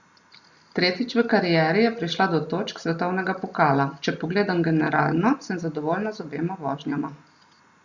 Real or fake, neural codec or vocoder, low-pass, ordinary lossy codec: real; none; 7.2 kHz; Opus, 64 kbps